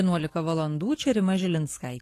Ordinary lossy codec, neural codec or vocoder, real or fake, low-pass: AAC, 48 kbps; codec, 44.1 kHz, 7.8 kbps, DAC; fake; 14.4 kHz